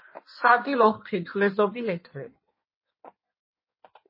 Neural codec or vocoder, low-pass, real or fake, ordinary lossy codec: codec, 16 kHz in and 24 kHz out, 1.1 kbps, FireRedTTS-2 codec; 5.4 kHz; fake; MP3, 24 kbps